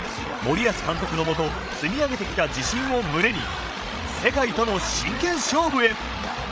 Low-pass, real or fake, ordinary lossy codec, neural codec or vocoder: none; fake; none; codec, 16 kHz, 16 kbps, FreqCodec, larger model